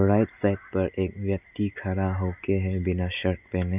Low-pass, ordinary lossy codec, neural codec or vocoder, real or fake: 3.6 kHz; none; none; real